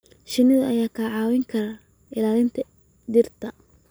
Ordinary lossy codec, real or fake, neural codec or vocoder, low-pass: none; real; none; none